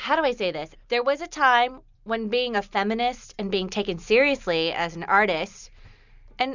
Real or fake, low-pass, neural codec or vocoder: real; 7.2 kHz; none